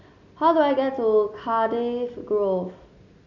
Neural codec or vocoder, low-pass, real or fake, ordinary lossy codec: none; 7.2 kHz; real; none